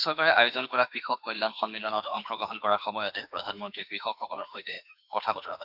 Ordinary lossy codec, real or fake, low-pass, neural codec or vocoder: none; fake; 5.4 kHz; autoencoder, 48 kHz, 32 numbers a frame, DAC-VAE, trained on Japanese speech